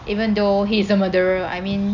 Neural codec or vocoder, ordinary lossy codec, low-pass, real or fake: none; none; 7.2 kHz; real